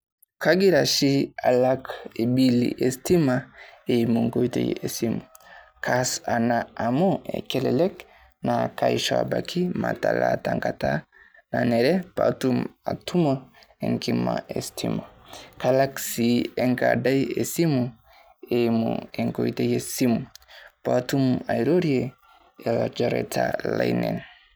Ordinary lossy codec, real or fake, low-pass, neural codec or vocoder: none; real; none; none